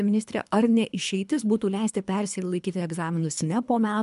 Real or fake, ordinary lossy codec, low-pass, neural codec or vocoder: fake; MP3, 96 kbps; 10.8 kHz; codec, 24 kHz, 3 kbps, HILCodec